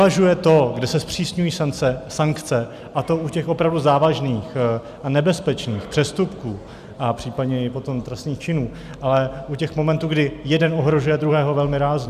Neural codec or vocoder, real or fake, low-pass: none; real; 14.4 kHz